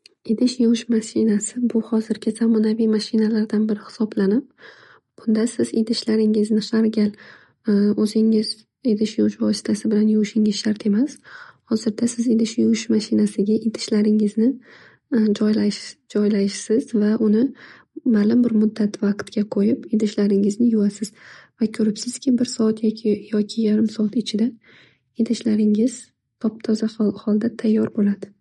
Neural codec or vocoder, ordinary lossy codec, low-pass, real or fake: none; MP3, 48 kbps; 19.8 kHz; real